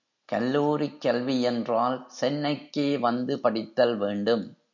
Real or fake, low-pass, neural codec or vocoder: real; 7.2 kHz; none